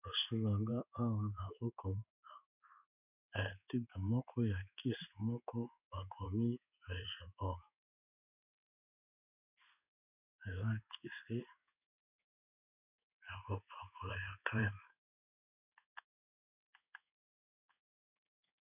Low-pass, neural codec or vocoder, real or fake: 3.6 kHz; codec, 16 kHz in and 24 kHz out, 1 kbps, XY-Tokenizer; fake